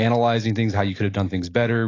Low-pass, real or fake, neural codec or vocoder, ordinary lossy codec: 7.2 kHz; real; none; AAC, 32 kbps